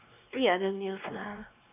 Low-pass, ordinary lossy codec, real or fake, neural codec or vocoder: 3.6 kHz; none; fake; codec, 16 kHz, 2 kbps, X-Codec, WavLM features, trained on Multilingual LibriSpeech